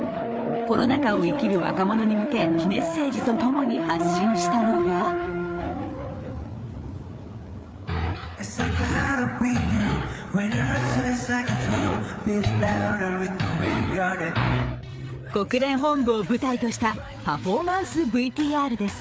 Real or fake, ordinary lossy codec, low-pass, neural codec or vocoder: fake; none; none; codec, 16 kHz, 4 kbps, FreqCodec, larger model